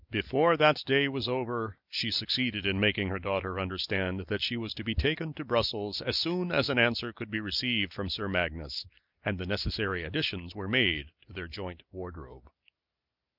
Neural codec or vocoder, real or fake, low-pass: none; real; 5.4 kHz